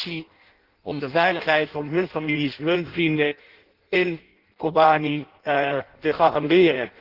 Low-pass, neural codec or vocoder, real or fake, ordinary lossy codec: 5.4 kHz; codec, 16 kHz in and 24 kHz out, 0.6 kbps, FireRedTTS-2 codec; fake; Opus, 16 kbps